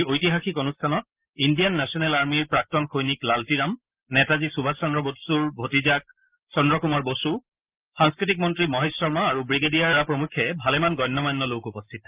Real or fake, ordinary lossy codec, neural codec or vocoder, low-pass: real; Opus, 32 kbps; none; 3.6 kHz